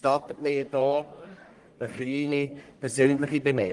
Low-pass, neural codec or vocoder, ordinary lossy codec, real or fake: 10.8 kHz; codec, 44.1 kHz, 1.7 kbps, Pupu-Codec; Opus, 24 kbps; fake